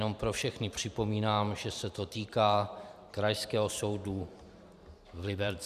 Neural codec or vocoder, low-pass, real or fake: vocoder, 44.1 kHz, 128 mel bands every 512 samples, BigVGAN v2; 14.4 kHz; fake